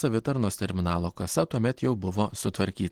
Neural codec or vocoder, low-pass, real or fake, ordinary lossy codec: none; 19.8 kHz; real; Opus, 16 kbps